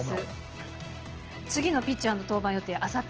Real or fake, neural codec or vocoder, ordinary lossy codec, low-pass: real; none; Opus, 16 kbps; 7.2 kHz